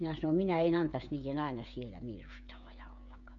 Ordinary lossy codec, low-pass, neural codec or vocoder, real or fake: none; 7.2 kHz; codec, 16 kHz, 16 kbps, FreqCodec, smaller model; fake